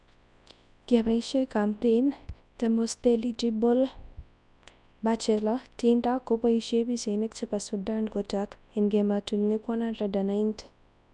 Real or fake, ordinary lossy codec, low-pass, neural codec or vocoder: fake; none; 10.8 kHz; codec, 24 kHz, 0.9 kbps, WavTokenizer, large speech release